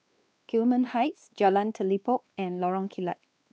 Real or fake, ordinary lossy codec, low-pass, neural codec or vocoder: fake; none; none; codec, 16 kHz, 2 kbps, X-Codec, WavLM features, trained on Multilingual LibriSpeech